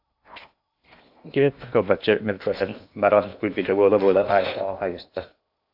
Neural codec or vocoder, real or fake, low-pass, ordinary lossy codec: codec, 16 kHz in and 24 kHz out, 0.8 kbps, FocalCodec, streaming, 65536 codes; fake; 5.4 kHz; AAC, 48 kbps